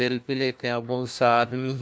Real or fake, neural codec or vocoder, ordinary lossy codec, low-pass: fake; codec, 16 kHz, 1 kbps, FunCodec, trained on LibriTTS, 50 frames a second; none; none